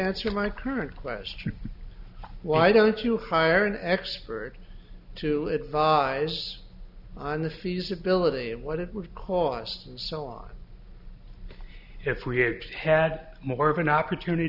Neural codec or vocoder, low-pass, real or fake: none; 5.4 kHz; real